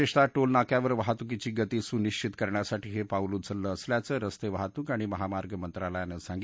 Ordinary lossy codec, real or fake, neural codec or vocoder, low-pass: none; real; none; none